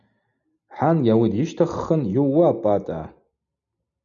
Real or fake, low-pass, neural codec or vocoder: real; 7.2 kHz; none